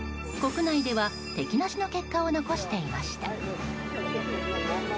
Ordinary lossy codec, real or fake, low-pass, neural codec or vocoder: none; real; none; none